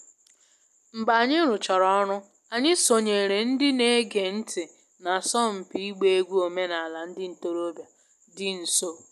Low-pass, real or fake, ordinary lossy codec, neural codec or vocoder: 14.4 kHz; real; none; none